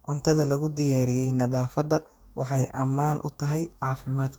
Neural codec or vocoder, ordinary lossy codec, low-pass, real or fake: codec, 44.1 kHz, 2.6 kbps, DAC; none; none; fake